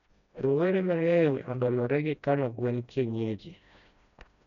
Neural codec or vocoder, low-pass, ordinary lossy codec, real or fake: codec, 16 kHz, 1 kbps, FreqCodec, smaller model; 7.2 kHz; none; fake